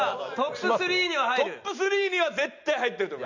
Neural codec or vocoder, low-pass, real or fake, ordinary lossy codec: none; 7.2 kHz; real; none